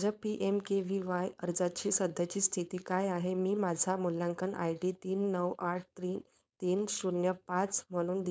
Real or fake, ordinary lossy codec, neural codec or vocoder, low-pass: fake; none; codec, 16 kHz, 4.8 kbps, FACodec; none